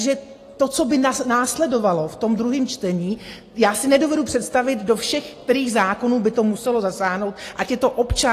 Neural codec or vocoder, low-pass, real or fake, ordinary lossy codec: none; 14.4 kHz; real; AAC, 48 kbps